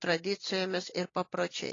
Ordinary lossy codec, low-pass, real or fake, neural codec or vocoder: AAC, 32 kbps; 7.2 kHz; real; none